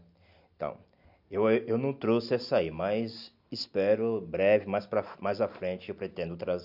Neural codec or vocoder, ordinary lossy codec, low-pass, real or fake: none; AAC, 48 kbps; 5.4 kHz; real